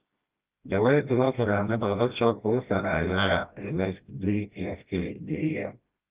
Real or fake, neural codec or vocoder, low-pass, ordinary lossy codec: fake; codec, 16 kHz, 1 kbps, FreqCodec, smaller model; 3.6 kHz; Opus, 24 kbps